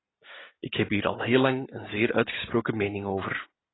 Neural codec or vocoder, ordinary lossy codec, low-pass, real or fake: none; AAC, 16 kbps; 7.2 kHz; real